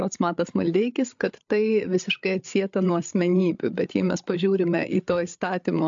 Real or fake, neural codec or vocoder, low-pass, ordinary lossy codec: fake; codec, 16 kHz, 8 kbps, FreqCodec, larger model; 7.2 kHz; AAC, 64 kbps